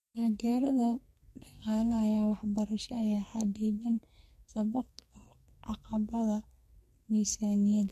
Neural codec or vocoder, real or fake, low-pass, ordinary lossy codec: codec, 44.1 kHz, 2.6 kbps, SNAC; fake; 14.4 kHz; MP3, 64 kbps